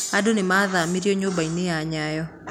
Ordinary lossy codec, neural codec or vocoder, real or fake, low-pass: none; none; real; 19.8 kHz